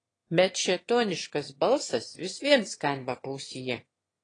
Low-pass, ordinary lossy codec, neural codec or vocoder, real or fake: 9.9 kHz; AAC, 32 kbps; autoencoder, 22.05 kHz, a latent of 192 numbers a frame, VITS, trained on one speaker; fake